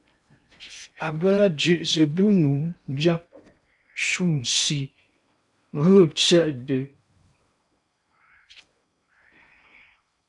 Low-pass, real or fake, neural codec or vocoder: 10.8 kHz; fake; codec, 16 kHz in and 24 kHz out, 0.8 kbps, FocalCodec, streaming, 65536 codes